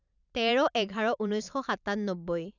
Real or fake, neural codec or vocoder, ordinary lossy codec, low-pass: fake; vocoder, 44.1 kHz, 128 mel bands every 512 samples, BigVGAN v2; none; 7.2 kHz